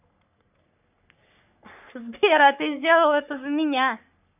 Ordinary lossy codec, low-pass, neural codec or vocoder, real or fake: none; 3.6 kHz; codec, 44.1 kHz, 3.4 kbps, Pupu-Codec; fake